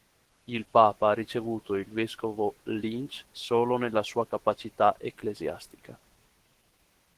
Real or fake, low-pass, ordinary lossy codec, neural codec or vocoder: fake; 14.4 kHz; Opus, 16 kbps; autoencoder, 48 kHz, 128 numbers a frame, DAC-VAE, trained on Japanese speech